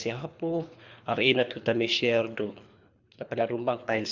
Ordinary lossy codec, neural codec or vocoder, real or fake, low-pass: none; codec, 24 kHz, 3 kbps, HILCodec; fake; 7.2 kHz